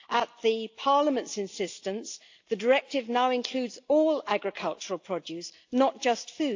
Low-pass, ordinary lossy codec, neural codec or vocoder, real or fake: 7.2 kHz; AAC, 48 kbps; none; real